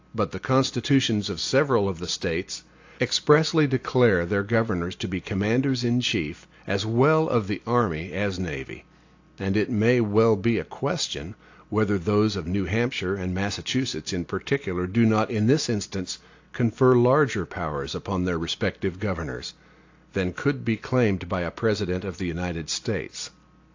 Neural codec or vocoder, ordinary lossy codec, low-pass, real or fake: none; AAC, 48 kbps; 7.2 kHz; real